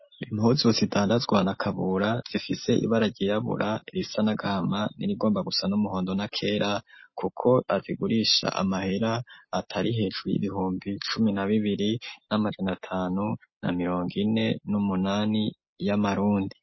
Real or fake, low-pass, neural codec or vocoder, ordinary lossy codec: real; 7.2 kHz; none; MP3, 24 kbps